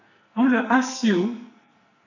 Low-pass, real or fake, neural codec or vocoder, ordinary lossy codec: 7.2 kHz; fake; codec, 44.1 kHz, 2.6 kbps, SNAC; none